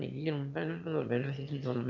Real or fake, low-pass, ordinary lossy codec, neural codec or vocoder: fake; 7.2 kHz; none; autoencoder, 22.05 kHz, a latent of 192 numbers a frame, VITS, trained on one speaker